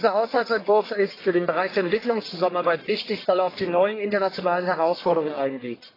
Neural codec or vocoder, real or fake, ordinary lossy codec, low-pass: codec, 44.1 kHz, 1.7 kbps, Pupu-Codec; fake; AAC, 24 kbps; 5.4 kHz